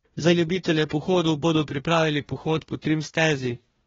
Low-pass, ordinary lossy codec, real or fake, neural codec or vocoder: 7.2 kHz; AAC, 24 kbps; fake; codec, 16 kHz, 1 kbps, FunCodec, trained on Chinese and English, 50 frames a second